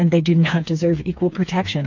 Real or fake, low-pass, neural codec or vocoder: fake; 7.2 kHz; codec, 16 kHz, 4 kbps, FreqCodec, smaller model